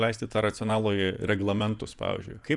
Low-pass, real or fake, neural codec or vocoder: 10.8 kHz; fake; vocoder, 24 kHz, 100 mel bands, Vocos